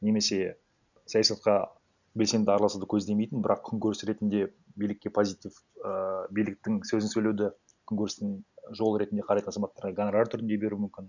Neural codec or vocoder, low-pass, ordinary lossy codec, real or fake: none; 7.2 kHz; none; real